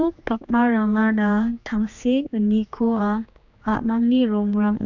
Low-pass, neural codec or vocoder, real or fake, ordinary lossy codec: 7.2 kHz; codec, 16 kHz, 2 kbps, X-Codec, HuBERT features, trained on general audio; fake; none